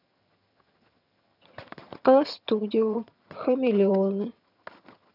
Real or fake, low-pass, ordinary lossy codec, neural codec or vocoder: fake; 5.4 kHz; none; vocoder, 22.05 kHz, 80 mel bands, HiFi-GAN